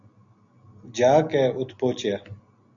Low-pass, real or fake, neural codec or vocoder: 7.2 kHz; real; none